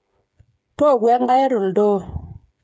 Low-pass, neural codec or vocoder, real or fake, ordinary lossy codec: none; codec, 16 kHz, 8 kbps, FreqCodec, smaller model; fake; none